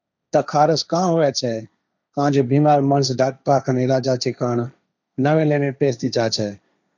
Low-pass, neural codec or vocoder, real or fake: 7.2 kHz; codec, 16 kHz, 1.1 kbps, Voila-Tokenizer; fake